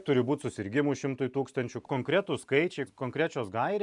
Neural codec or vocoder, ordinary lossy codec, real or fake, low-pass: none; MP3, 96 kbps; real; 10.8 kHz